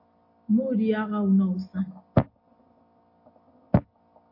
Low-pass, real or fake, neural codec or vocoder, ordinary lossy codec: 5.4 kHz; real; none; MP3, 32 kbps